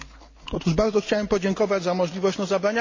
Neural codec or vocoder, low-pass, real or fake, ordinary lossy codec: none; 7.2 kHz; real; MP3, 32 kbps